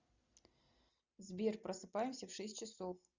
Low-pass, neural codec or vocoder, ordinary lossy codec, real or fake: 7.2 kHz; none; Opus, 64 kbps; real